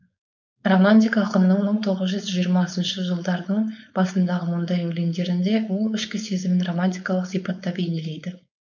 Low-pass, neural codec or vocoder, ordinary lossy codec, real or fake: 7.2 kHz; codec, 16 kHz, 4.8 kbps, FACodec; none; fake